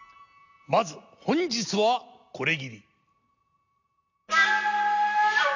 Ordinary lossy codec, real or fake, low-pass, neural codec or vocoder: AAC, 48 kbps; real; 7.2 kHz; none